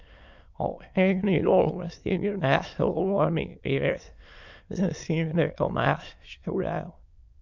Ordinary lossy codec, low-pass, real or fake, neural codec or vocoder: MP3, 64 kbps; 7.2 kHz; fake; autoencoder, 22.05 kHz, a latent of 192 numbers a frame, VITS, trained on many speakers